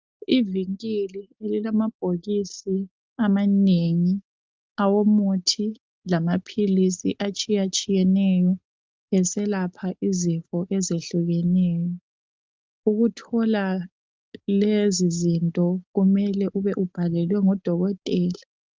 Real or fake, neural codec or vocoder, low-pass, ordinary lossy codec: real; none; 7.2 kHz; Opus, 32 kbps